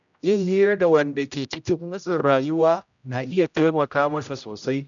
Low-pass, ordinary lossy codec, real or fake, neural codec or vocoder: 7.2 kHz; none; fake; codec, 16 kHz, 0.5 kbps, X-Codec, HuBERT features, trained on general audio